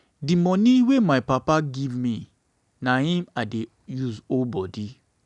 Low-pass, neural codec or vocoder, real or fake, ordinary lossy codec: 10.8 kHz; none; real; none